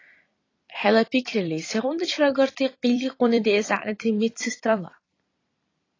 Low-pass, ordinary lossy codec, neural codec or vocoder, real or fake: 7.2 kHz; AAC, 32 kbps; vocoder, 44.1 kHz, 128 mel bands every 256 samples, BigVGAN v2; fake